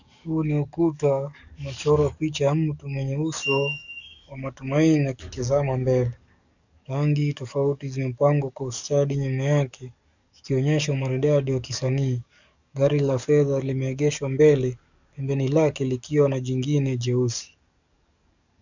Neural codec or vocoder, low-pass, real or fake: codec, 44.1 kHz, 7.8 kbps, DAC; 7.2 kHz; fake